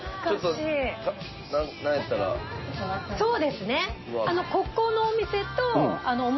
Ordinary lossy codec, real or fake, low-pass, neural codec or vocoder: MP3, 24 kbps; real; 7.2 kHz; none